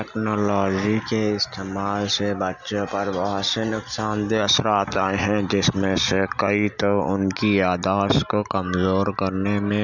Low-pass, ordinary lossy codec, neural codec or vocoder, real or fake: 7.2 kHz; none; none; real